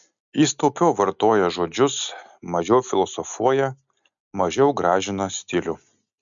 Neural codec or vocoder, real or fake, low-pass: none; real; 7.2 kHz